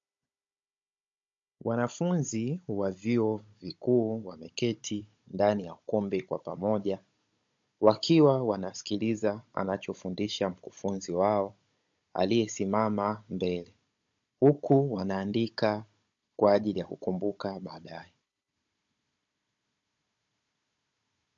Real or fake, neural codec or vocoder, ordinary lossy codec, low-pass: fake; codec, 16 kHz, 16 kbps, FunCodec, trained on Chinese and English, 50 frames a second; MP3, 48 kbps; 7.2 kHz